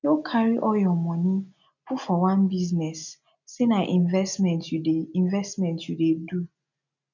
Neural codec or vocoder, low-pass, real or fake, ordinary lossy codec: none; 7.2 kHz; real; none